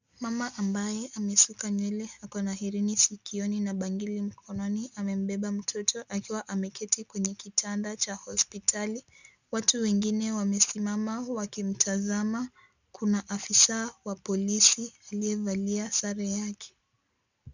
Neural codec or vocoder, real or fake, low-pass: none; real; 7.2 kHz